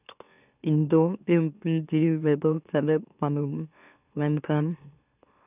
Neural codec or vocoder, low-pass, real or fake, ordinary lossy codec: autoencoder, 44.1 kHz, a latent of 192 numbers a frame, MeloTTS; 3.6 kHz; fake; none